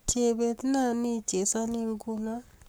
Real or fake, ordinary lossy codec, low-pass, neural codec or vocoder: fake; none; none; codec, 44.1 kHz, 7.8 kbps, Pupu-Codec